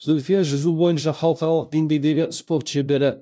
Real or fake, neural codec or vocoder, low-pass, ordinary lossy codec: fake; codec, 16 kHz, 0.5 kbps, FunCodec, trained on LibriTTS, 25 frames a second; none; none